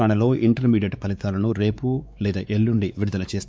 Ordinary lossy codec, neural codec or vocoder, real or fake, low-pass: none; codec, 16 kHz, 4 kbps, X-Codec, WavLM features, trained on Multilingual LibriSpeech; fake; none